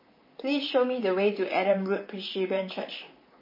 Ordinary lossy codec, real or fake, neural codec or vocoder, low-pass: MP3, 24 kbps; fake; vocoder, 44.1 kHz, 128 mel bands, Pupu-Vocoder; 5.4 kHz